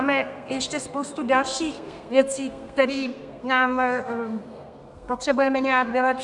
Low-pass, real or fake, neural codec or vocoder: 10.8 kHz; fake; codec, 32 kHz, 1.9 kbps, SNAC